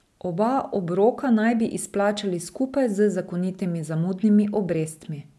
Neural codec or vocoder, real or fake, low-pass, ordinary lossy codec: none; real; none; none